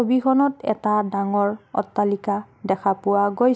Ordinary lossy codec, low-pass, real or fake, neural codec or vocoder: none; none; real; none